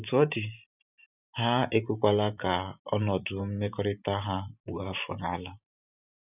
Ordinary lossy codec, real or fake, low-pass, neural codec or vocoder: none; fake; 3.6 kHz; vocoder, 44.1 kHz, 128 mel bands every 512 samples, BigVGAN v2